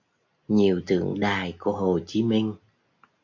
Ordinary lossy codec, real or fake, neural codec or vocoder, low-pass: AAC, 48 kbps; real; none; 7.2 kHz